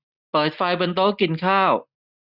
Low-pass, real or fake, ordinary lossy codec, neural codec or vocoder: 5.4 kHz; real; none; none